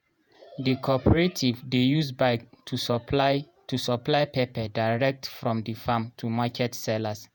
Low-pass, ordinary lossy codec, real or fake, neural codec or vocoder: none; none; fake; vocoder, 48 kHz, 128 mel bands, Vocos